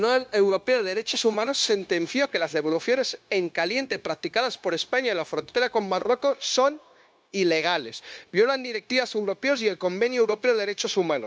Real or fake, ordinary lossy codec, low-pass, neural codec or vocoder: fake; none; none; codec, 16 kHz, 0.9 kbps, LongCat-Audio-Codec